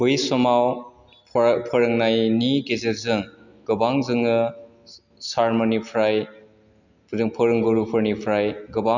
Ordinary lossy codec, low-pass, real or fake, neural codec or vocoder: none; 7.2 kHz; real; none